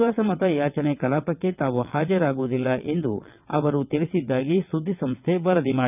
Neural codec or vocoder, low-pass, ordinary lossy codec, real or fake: vocoder, 22.05 kHz, 80 mel bands, WaveNeXt; 3.6 kHz; none; fake